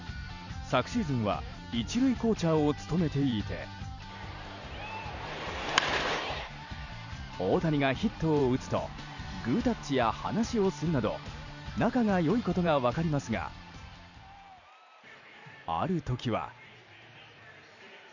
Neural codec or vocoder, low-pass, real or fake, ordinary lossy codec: vocoder, 44.1 kHz, 128 mel bands every 512 samples, BigVGAN v2; 7.2 kHz; fake; none